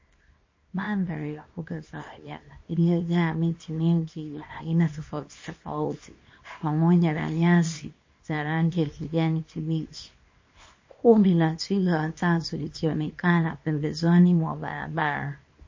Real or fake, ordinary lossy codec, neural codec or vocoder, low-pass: fake; MP3, 32 kbps; codec, 24 kHz, 0.9 kbps, WavTokenizer, small release; 7.2 kHz